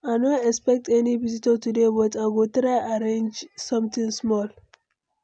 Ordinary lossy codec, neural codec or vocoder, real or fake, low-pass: none; none; real; 9.9 kHz